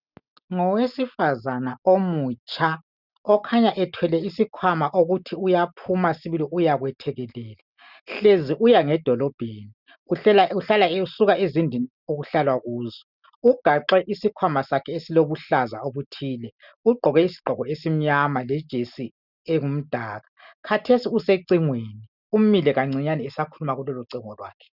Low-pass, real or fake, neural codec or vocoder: 5.4 kHz; real; none